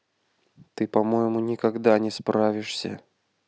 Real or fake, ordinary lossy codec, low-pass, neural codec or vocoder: real; none; none; none